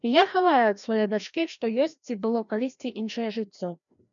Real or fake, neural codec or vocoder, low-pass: fake; codec, 16 kHz, 1 kbps, FreqCodec, larger model; 7.2 kHz